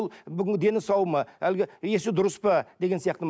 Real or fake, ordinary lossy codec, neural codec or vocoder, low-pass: real; none; none; none